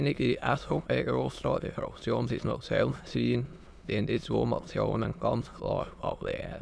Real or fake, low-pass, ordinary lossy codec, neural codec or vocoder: fake; none; none; autoencoder, 22.05 kHz, a latent of 192 numbers a frame, VITS, trained on many speakers